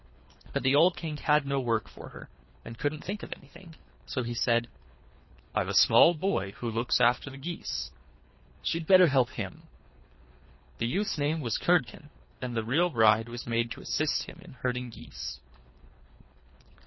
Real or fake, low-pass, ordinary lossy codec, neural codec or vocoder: fake; 7.2 kHz; MP3, 24 kbps; codec, 24 kHz, 3 kbps, HILCodec